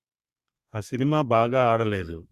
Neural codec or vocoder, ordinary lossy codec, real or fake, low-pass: codec, 32 kHz, 1.9 kbps, SNAC; Opus, 64 kbps; fake; 14.4 kHz